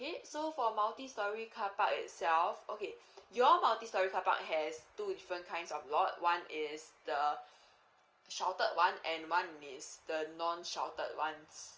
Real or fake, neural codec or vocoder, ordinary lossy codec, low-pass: real; none; Opus, 24 kbps; 7.2 kHz